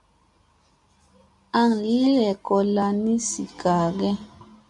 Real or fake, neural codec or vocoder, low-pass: real; none; 10.8 kHz